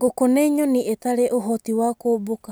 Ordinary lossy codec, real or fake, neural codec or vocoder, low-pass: none; real; none; none